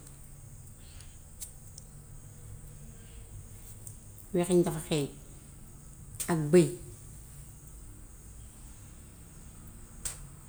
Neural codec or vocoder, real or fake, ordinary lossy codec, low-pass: none; real; none; none